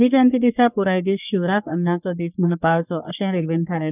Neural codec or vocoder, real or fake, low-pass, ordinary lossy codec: codec, 44.1 kHz, 3.4 kbps, Pupu-Codec; fake; 3.6 kHz; none